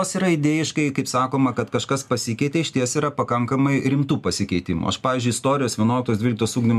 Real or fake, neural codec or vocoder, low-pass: real; none; 14.4 kHz